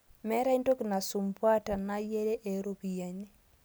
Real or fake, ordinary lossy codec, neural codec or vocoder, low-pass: real; none; none; none